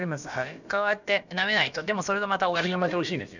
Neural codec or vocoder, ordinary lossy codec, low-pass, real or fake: codec, 16 kHz, about 1 kbps, DyCAST, with the encoder's durations; none; 7.2 kHz; fake